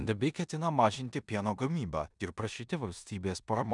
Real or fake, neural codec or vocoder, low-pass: fake; codec, 16 kHz in and 24 kHz out, 0.9 kbps, LongCat-Audio-Codec, four codebook decoder; 10.8 kHz